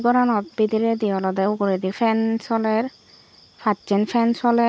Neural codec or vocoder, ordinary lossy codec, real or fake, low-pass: none; none; real; none